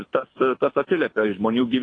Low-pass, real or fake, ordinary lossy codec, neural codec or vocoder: 9.9 kHz; fake; AAC, 32 kbps; codec, 24 kHz, 6 kbps, HILCodec